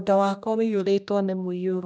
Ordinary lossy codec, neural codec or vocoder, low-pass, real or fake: none; codec, 16 kHz, 1 kbps, X-Codec, HuBERT features, trained on general audio; none; fake